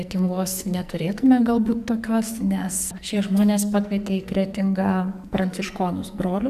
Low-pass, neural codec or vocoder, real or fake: 14.4 kHz; codec, 44.1 kHz, 2.6 kbps, SNAC; fake